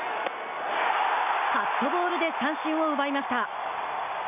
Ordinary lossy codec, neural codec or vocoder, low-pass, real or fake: none; none; 3.6 kHz; real